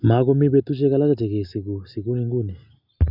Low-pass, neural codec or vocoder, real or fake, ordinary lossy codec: 5.4 kHz; none; real; none